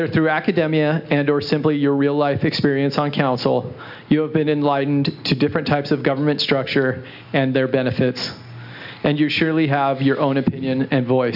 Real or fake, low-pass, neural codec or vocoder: real; 5.4 kHz; none